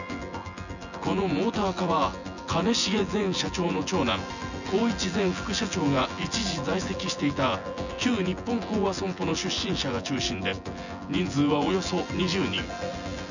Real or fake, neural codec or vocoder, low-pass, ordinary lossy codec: fake; vocoder, 24 kHz, 100 mel bands, Vocos; 7.2 kHz; none